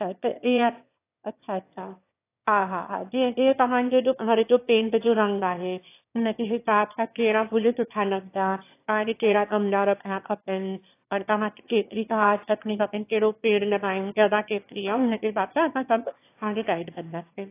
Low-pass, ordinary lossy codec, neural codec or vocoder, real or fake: 3.6 kHz; AAC, 24 kbps; autoencoder, 22.05 kHz, a latent of 192 numbers a frame, VITS, trained on one speaker; fake